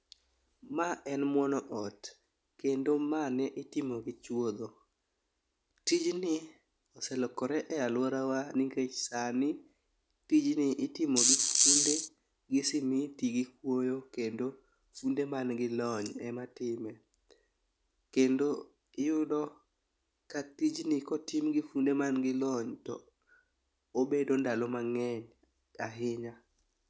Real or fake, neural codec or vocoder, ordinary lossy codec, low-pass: real; none; none; none